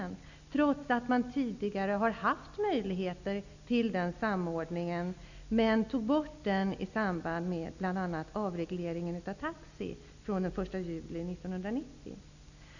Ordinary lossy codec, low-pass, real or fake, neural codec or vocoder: none; 7.2 kHz; real; none